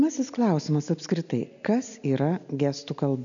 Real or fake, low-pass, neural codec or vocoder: real; 7.2 kHz; none